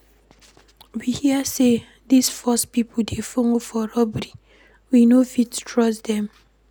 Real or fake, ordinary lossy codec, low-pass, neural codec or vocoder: real; none; none; none